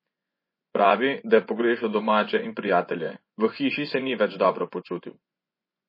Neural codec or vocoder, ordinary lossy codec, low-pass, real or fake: vocoder, 24 kHz, 100 mel bands, Vocos; MP3, 24 kbps; 5.4 kHz; fake